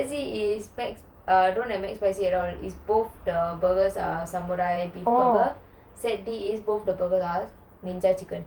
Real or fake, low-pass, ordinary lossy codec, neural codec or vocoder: fake; 19.8 kHz; Opus, 32 kbps; vocoder, 48 kHz, 128 mel bands, Vocos